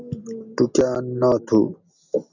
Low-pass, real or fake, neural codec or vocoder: 7.2 kHz; real; none